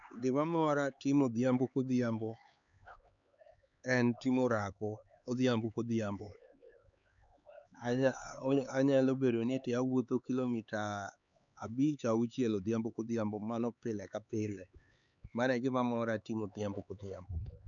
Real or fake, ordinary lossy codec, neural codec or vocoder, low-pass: fake; none; codec, 16 kHz, 4 kbps, X-Codec, HuBERT features, trained on LibriSpeech; 7.2 kHz